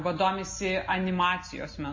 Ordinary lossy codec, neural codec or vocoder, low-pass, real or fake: MP3, 32 kbps; none; 7.2 kHz; real